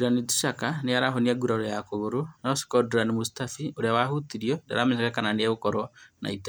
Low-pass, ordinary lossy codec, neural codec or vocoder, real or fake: none; none; none; real